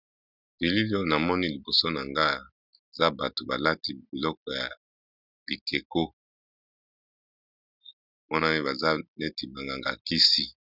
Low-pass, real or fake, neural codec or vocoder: 5.4 kHz; real; none